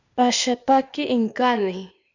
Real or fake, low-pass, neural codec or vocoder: fake; 7.2 kHz; codec, 16 kHz, 0.8 kbps, ZipCodec